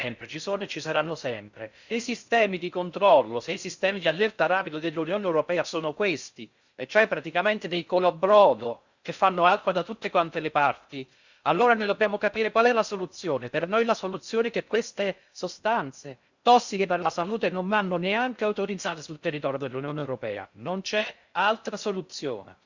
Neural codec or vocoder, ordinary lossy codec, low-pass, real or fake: codec, 16 kHz in and 24 kHz out, 0.6 kbps, FocalCodec, streaming, 4096 codes; Opus, 64 kbps; 7.2 kHz; fake